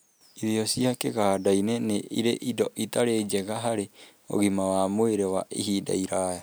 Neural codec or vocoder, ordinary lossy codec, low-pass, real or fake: none; none; none; real